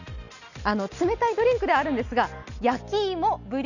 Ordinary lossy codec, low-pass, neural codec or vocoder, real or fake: none; 7.2 kHz; none; real